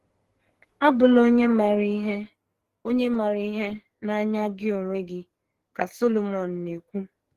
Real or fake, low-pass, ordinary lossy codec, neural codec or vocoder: fake; 14.4 kHz; Opus, 16 kbps; codec, 44.1 kHz, 2.6 kbps, SNAC